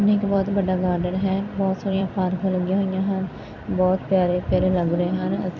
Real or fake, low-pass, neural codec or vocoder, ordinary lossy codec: fake; 7.2 kHz; vocoder, 44.1 kHz, 128 mel bands every 256 samples, BigVGAN v2; none